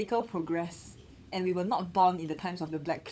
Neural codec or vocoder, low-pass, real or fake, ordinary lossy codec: codec, 16 kHz, 16 kbps, FunCodec, trained on LibriTTS, 50 frames a second; none; fake; none